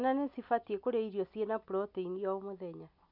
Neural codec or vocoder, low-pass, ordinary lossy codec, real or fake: none; 5.4 kHz; none; real